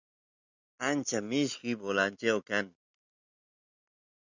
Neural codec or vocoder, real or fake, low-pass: none; real; 7.2 kHz